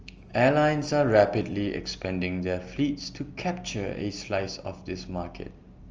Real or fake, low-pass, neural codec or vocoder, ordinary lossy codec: real; 7.2 kHz; none; Opus, 24 kbps